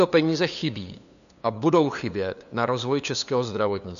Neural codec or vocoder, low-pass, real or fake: codec, 16 kHz, 2 kbps, FunCodec, trained on LibriTTS, 25 frames a second; 7.2 kHz; fake